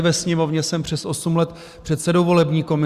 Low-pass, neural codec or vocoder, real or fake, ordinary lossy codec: 14.4 kHz; none; real; Opus, 64 kbps